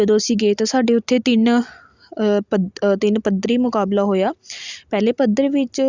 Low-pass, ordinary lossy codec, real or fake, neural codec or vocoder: 7.2 kHz; Opus, 64 kbps; real; none